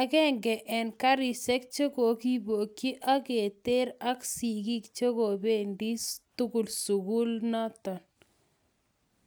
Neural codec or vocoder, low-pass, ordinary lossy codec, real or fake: none; none; none; real